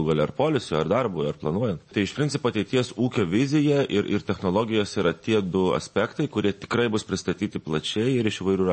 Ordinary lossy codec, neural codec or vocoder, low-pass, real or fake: MP3, 32 kbps; none; 10.8 kHz; real